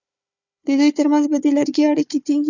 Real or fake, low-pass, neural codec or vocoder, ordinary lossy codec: fake; 7.2 kHz; codec, 16 kHz, 4 kbps, FunCodec, trained on Chinese and English, 50 frames a second; Opus, 64 kbps